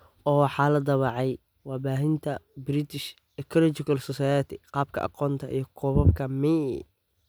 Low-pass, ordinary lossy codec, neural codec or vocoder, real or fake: none; none; none; real